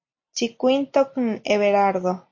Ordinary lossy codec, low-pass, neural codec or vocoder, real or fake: MP3, 32 kbps; 7.2 kHz; none; real